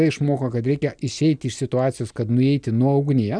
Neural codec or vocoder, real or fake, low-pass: none; real; 9.9 kHz